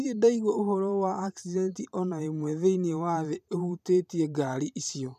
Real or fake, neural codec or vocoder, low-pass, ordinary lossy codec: fake; vocoder, 44.1 kHz, 128 mel bands every 512 samples, BigVGAN v2; 14.4 kHz; none